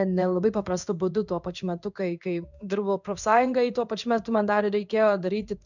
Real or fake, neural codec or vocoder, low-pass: fake; codec, 16 kHz in and 24 kHz out, 1 kbps, XY-Tokenizer; 7.2 kHz